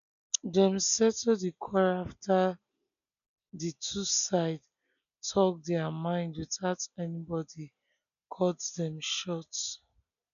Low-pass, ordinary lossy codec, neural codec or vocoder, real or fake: 7.2 kHz; none; none; real